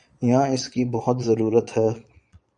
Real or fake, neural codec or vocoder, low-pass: fake; vocoder, 22.05 kHz, 80 mel bands, Vocos; 9.9 kHz